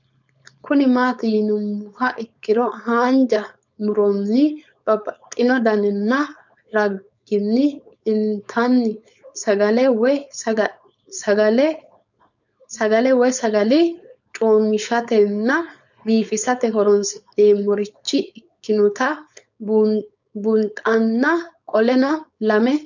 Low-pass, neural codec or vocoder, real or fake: 7.2 kHz; codec, 16 kHz, 4.8 kbps, FACodec; fake